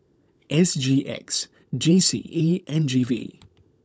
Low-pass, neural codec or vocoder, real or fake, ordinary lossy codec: none; codec, 16 kHz, 16 kbps, FunCodec, trained on LibriTTS, 50 frames a second; fake; none